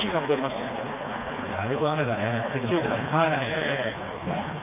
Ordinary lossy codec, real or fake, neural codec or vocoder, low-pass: MP3, 24 kbps; fake; codec, 16 kHz, 2 kbps, FreqCodec, smaller model; 3.6 kHz